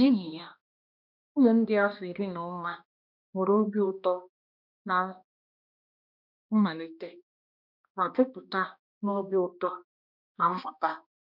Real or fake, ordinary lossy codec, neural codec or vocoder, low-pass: fake; none; codec, 16 kHz, 1 kbps, X-Codec, HuBERT features, trained on balanced general audio; 5.4 kHz